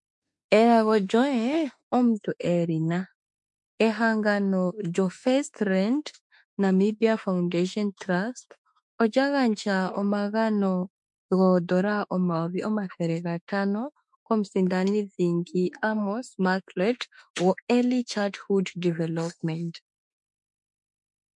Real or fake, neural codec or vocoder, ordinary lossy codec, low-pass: fake; autoencoder, 48 kHz, 32 numbers a frame, DAC-VAE, trained on Japanese speech; MP3, 64 kbps; 10.8 kHz